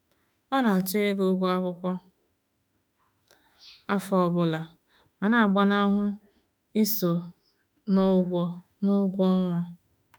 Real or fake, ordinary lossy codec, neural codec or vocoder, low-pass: fake; none; autoencoder, 48 kHz, 32 numbers a frame, DAC-VAE, trained on Japanese speech; none